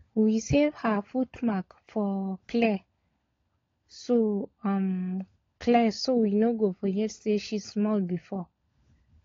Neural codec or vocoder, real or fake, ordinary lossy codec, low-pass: codec, 16 kHz, 16 kbps, FunCodec, trained on LibriTTS, 50 frames a second; fake; AAC, 32 kbps; 7.2 kHz